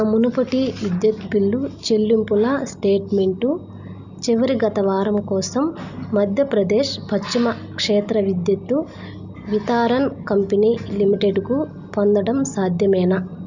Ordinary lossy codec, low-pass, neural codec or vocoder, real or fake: none; 7.2 kHz; none; real